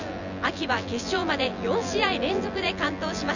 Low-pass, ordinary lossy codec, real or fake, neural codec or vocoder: 7.2 kHz; none; fake; vocoder, 24 kHz, 100 mel bands, Vocos